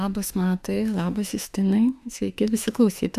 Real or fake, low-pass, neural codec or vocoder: fake; 14.4 kHz; autoencoder, 48 kHz, 32 numbers a frame, DAC-VAE, trained on Japanese speech